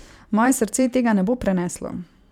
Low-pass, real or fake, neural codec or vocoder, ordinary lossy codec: 19.8 kHz; fake; vocoder, 44.1 kHz, 128 mel bands every 512 samples, BigVGAN v2; none